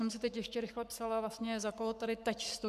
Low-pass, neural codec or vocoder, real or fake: 14.4 kHz; codec, 44.1 kHz, 7.8 kbps, Pupu-Codec; fake